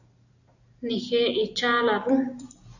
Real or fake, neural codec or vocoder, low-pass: real; none; 7.2 kHz